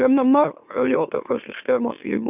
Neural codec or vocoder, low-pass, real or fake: autoencoder, 44.1 kHz, a latent of 192 numbers a frame, MeloTTS; 3.6 kHz; fake